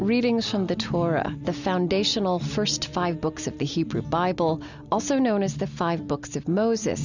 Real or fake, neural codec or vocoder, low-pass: real; none; 7.2 kHz